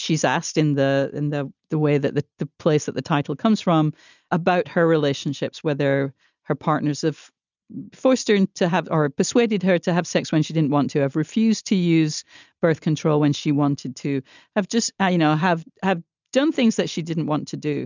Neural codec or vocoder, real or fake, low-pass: none; real; 7.2 kHz